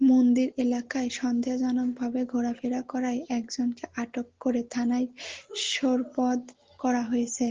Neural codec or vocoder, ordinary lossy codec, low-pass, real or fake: none; Opus, 16 kbps; 7.2 kHz; real